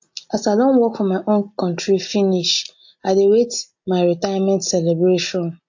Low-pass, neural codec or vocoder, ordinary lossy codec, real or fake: 7.2 kHz; none; MP3, 48 kbps; real